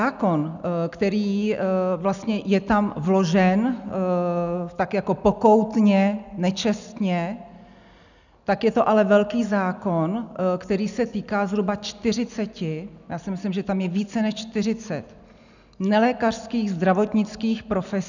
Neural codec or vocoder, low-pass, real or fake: none; 7.2 kHz; real